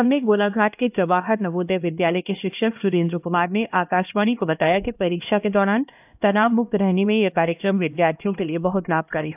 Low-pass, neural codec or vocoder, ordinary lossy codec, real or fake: 3.6 kHz; codec, 16 kHz, 1 kbps, X-Codec, HuBERT features, trained on LibriSpeech; AAC, 32 kbps; fake